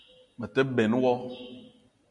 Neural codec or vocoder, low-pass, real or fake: none; 10.8 kHz; real